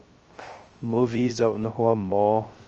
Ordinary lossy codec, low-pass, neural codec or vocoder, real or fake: Opus, 24 kbps; 7.2 kHz; codec, 16 kHz, 0.3 kbps, FocalCodec; fake